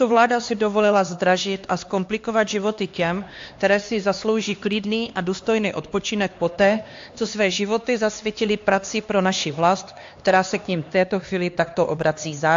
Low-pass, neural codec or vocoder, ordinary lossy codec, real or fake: 7.2 kHz; codec, 16 kHz, 2 kbps, X-Codec, HuBERT features, trained on LibriSpeech; AAC, 48 kbps; fake